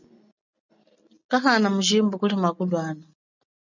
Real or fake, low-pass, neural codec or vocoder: real; 7.2 kHz; none